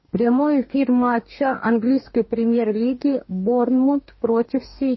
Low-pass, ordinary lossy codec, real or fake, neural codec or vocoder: 7.2 kHz; MP3, 24 kbps; fake; codec, 44.1 kHz, 2.6 kbps, DAC